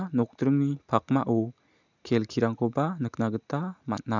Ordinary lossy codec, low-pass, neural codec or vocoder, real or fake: none; 7.2 kHz; none; real